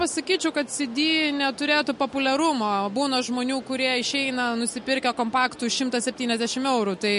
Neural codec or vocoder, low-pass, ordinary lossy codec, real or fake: none; 10.8 kHz; MP3, 48 kbps; real